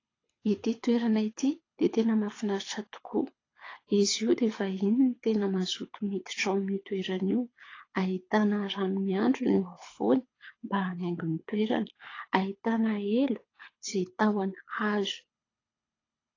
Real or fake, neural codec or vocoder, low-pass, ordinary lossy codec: fake; codec, 24 kHz, 6 kbps, HILCodec; 7.2 kHz; AAC, 32 kbps